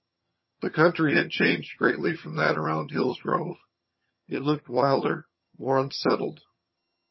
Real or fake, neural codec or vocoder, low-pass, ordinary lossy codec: fake; vocoder, 22.05 kHz, 80 mel bands, HiFi-GAN; 7.2 kHz; MP3, 24 kbps